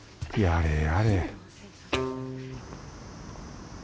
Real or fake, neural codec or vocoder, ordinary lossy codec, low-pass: real; none; none; none